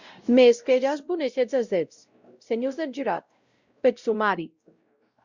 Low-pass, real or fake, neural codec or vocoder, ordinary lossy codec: 7.2 kHz; fake; codec, 16 kHz, 0.5 kbps, X-Codec, WavLM features, trained on Multilingual LibriSpeech; Opus, 64 kbps